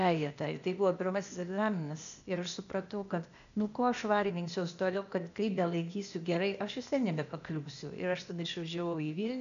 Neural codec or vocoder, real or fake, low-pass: codec, 16 kHz, 0.8 kbps, ZipCodec; fake; 7.2 kHz